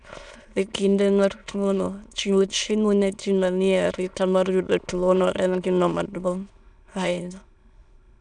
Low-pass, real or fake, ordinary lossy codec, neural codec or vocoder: 9.9 kHz; fake; none; autoencoder, 22.05 kHz, a latent of 192 numbers a frame, VITS, trained on many speakers